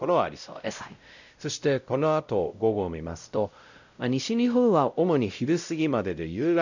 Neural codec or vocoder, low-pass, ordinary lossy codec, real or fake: codec, 16 kHz, 0.5 kbps, X-Codec, WavLM features, trained on Multilingual LibriSpeech; 7.2 kHz; none; fake